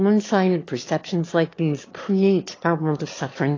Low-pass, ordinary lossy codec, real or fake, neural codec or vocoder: 7.2 kHz; AAC, 32 kbps; fake; autoencoder, 22.05 kHz, a latent of 192 numbers a frame, VITS, trained on one speaker